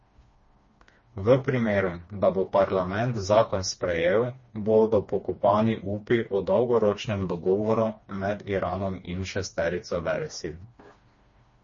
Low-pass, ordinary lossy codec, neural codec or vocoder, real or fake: 7.2 kHz; MP3, 32 kbps; codec, 16 kHz, 2 kbps, FreqCodec, smaller model; fake